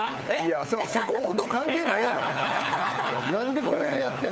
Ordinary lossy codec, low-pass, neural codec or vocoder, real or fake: none; none; codec, 16 kHz, 4 kbps, FunCodec, trained on LibriTTS, 50 frames a second; fake